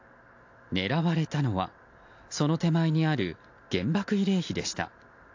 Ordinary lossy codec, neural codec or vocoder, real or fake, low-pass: AAC, 48 kbps; none; real; 7.2 kHz